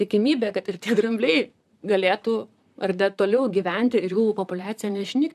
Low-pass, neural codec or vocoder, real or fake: 14.4 kHz; vocoder, 44.1 kHz, 128 mel bands, Pupu-Vocoder; fake